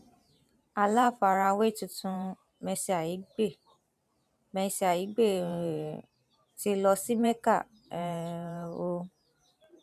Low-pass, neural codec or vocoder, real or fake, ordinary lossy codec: 14.4 kHz; vocoder, 44.1 kHz, 128 mel bands every 512 samples, BigVGAN v2; fake; none